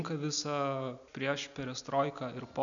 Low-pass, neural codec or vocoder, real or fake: 7.2 kHz; none; real